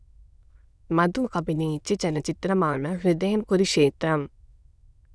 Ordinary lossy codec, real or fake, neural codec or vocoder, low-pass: none; fake; autoencoder, 22.05 kHz, a latent of 192 numbers a frame, VITS, trained on many speakers; none